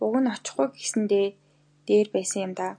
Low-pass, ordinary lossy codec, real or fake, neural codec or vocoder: 9.9 kHz; AAC, 64 kbps; real; none